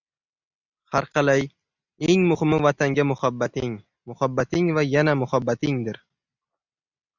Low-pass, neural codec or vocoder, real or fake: 7.2 kHz; none; real